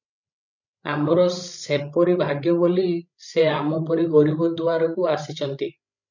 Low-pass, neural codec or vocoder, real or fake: 7.2 kHz; codec, 16 kHz, 16 kbps, FreqCodec, larger model; fake